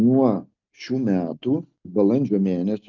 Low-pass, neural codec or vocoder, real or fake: 7.2 kHz; none; real